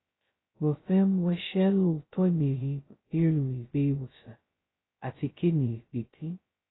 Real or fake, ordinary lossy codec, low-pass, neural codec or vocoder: fake; AAC, 16 kbps; 7.2 kHz; codec, 16 kHz, 0.2 kbps, FocalCodec